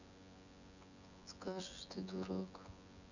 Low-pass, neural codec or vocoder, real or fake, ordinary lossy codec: 7.2 kHz; vocoder, 24 kHz, 100 mel bands, Vocos; fake; none